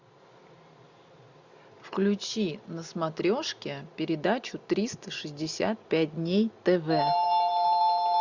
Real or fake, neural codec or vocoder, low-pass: real; none; 7.2 kHz